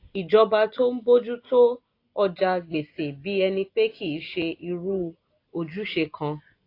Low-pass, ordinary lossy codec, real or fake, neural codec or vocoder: 5.4 kHz; AAC, 32 kbps; real; none